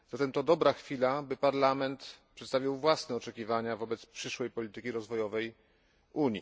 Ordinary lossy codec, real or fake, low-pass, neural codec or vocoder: none; real; none; none